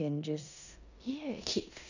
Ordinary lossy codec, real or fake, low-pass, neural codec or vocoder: none; fake; 7.2 kHz; codec, 16 kHz in and 24 kHz out, 0.9 kbps, LongCat-Audio-Codec, four codebook decoder